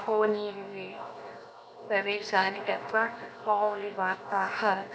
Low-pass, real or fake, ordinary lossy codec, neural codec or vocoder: none; fake; none; codec, 16 kHz, 0.7 kbps, FocalCodec